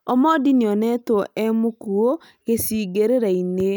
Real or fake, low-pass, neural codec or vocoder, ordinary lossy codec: real; none; none; none